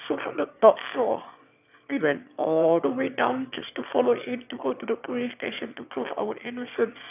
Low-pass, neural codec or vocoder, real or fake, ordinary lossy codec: 3.6 kHz; autoencoder, 22.05 kHz, a latent of 192 numbers a frame, VITS, trained on one speaker; fake; none